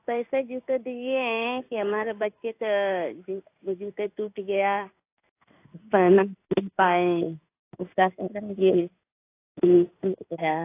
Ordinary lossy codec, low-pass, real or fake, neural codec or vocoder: AAC, 24 kbps; 3.6 kHz; fake; codec, 16 kHz in and 24 kHz out, 1 kbps, XY-Tokenizer